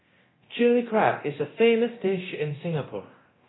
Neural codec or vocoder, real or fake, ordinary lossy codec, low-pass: codec, 24 kHz, 0.9 kbps, DualCodec; fake; AAC, 16 kbps; 7.2 kHz